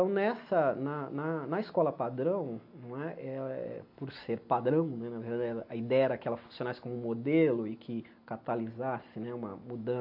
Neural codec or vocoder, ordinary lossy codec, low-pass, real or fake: none; AAC, 48 kbps; 5.4 kHz; real